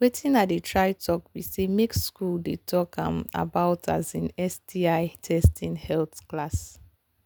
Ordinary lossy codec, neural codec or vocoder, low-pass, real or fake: none; none; none; real